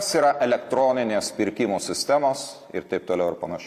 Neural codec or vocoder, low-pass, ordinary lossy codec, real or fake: none; 14.4 kHz; Opus, 64 kbps; real